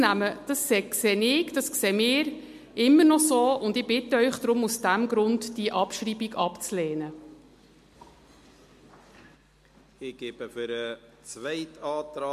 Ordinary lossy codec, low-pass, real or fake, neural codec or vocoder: MP3, 64 kbps; 14.4 kHz; real; none